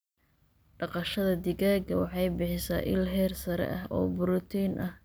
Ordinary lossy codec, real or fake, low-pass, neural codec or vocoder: none; real; none; none